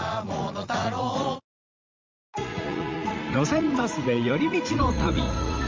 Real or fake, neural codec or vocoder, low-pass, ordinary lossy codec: fake; vocoder, 44.1 kHz, 80 mel bands, Vocos; 7.2 kHz; Opus, 32 kbps